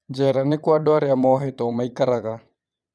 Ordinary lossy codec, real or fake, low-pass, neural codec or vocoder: none; fake; none; vocoder, 22.05 kHz, 80 mel bands, Vocos